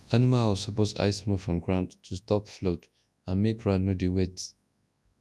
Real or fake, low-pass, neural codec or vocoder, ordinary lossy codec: fake; none; codec, 24 kHz, 0.9 kbps, WavTokenizer, large speech release; none